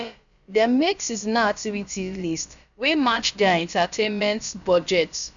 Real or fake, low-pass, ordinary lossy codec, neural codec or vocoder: fake; 7.2 kHz; none; codec, 16 kHz, about 1 kbps, DyCAST, with the encoder's durations